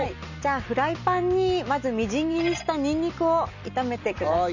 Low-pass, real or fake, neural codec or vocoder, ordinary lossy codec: 7.2 kHz; real; none; none